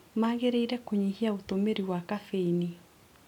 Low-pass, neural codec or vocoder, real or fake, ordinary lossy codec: 19.8 kHz; none; real; none